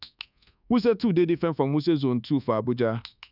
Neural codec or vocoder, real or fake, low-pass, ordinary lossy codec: codec, 24 kHz, 1.2 kbps, DualCodec; fake; 5.4 kHz; none